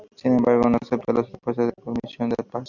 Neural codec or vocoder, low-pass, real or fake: none; 7.2 kHz; real